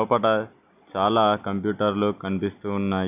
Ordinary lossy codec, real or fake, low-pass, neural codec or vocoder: AAC, 32 kbps; real; 3.6 kHz; none